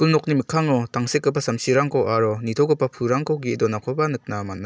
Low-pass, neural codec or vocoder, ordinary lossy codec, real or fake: none; none; none; real